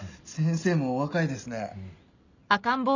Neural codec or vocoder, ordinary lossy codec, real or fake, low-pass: none; none; real; 7.2 kHz